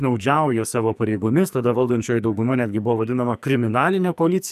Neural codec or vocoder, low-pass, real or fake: codec, 44.1 kHz, 2.6 kbps, SNAC; 14.4 kHz; fake